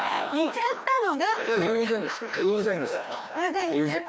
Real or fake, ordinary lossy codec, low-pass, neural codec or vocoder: fake; none; none; codec, 16 kHz, 1 kbps, FreqCodec, larger model